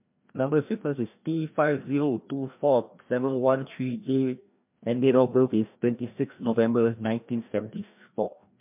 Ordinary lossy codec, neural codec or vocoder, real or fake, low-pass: MP3, 32 kbps; codec, 16 kHz, 1 kbps, FreqCodec, larger model; fake; 3.6 kHz